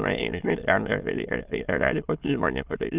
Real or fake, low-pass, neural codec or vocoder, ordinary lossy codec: fake; 3.6 kHz; autoencoder, 22.05 kHz, a latent of 192 numbers a frame, VITS, trained on many speakers; Opus, 32 kbps